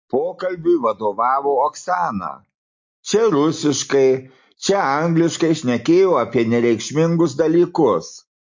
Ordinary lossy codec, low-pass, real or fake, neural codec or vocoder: MP3, 48 kbps; 7.2 kHz; real; none